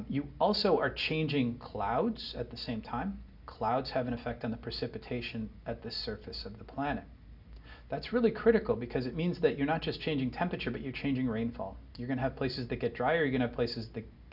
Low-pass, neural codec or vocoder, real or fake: 5.4 kHz; none; real